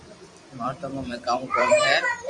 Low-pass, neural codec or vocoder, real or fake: 10.8 kHz; vocoder, 44.1 kHz, 128 mel bands every 256 samples, BigVGAN v2; fake